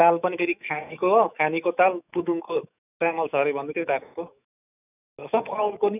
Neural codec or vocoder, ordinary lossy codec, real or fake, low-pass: autoencoder, 48 kHz, 128 numbers a frame, DAC-VAE, trained on Japanese speech; none; fake; 3.6 kHz